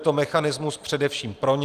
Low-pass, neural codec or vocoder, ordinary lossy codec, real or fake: 14.4 kHz; none; Opus, 32 kbps; real